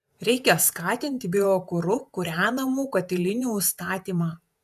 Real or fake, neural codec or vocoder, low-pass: fake; vocoder, 48 kHz, 128 mel bands, Vocos; 14.4 kHz